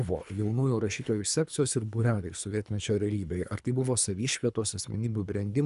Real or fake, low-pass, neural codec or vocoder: fake; 10.8 kHz; codec, 24 kHz, 3 kbps, HILCodec